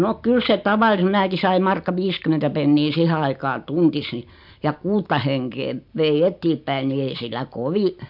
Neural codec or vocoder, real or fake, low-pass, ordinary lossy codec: none; real; 5.4 kHz; MP3, 48 kbps